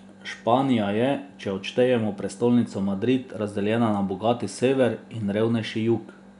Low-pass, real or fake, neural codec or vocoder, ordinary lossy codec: 10.8 kHz; real; none; none